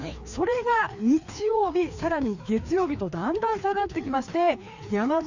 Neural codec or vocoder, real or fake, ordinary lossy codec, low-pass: codec, 16 kHz, 2 kbps, FreqCodec, larger model; fake; none; 7.2 kHz